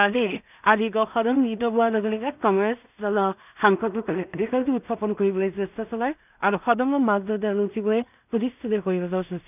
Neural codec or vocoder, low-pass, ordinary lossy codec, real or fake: codec, 16 kHz in and 24 kHz out, 0.4 kbps, LongCat-Audio-Codec, two codebook decoder; 3.6 kHz; none; fake